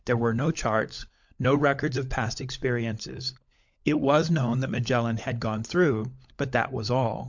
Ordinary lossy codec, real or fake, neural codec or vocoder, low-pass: MP3, 64 kbps; fake; codec, 16 kHz, 16 kbps, FunCodec, trained on LibriTTS, 50 frames a second; 7.2 kHz